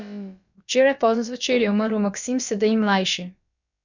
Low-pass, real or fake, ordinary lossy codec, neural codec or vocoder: 7.2 kHz; fake; none; codec, 16 kHz, about 1 kbps, DyCAST, with the encoder's durations